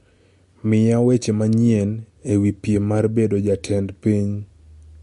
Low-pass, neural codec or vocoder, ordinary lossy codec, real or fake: 14.4 kHz; none; MP3, 48 kbps; real